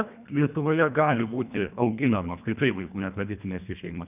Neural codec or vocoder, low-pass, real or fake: codec, 24 kHz, 1.5 kbps, HILCodec; 3.6 kHz; fake